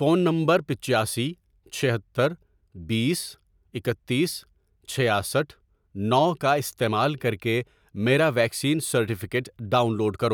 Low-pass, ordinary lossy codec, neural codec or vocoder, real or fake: none; none; none; real